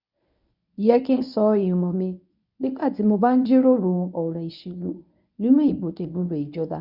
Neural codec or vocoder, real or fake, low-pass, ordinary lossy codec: codec, 24 kHz, 0.9 kbps, WavTokenizer, medium speech release version 1; fake; 5.4 kHz; none